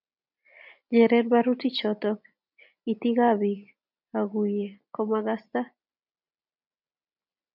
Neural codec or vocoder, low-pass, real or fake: none; 5.4 kHz; real